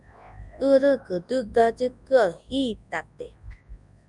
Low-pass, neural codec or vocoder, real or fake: 10.8 kHz; codec, 24 kHz, 0.9 kbps, WavTokenizer, large speech release; fake